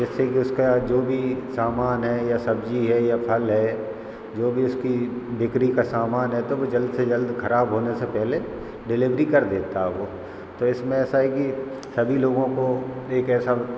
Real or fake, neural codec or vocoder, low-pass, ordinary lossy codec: real; none; none; none